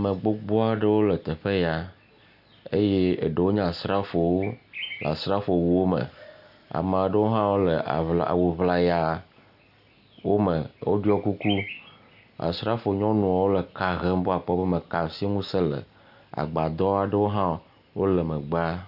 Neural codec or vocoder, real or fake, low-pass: none; real; 5.4 kHz